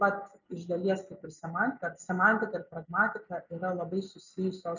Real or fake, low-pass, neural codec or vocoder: real; 7.2 kHz; none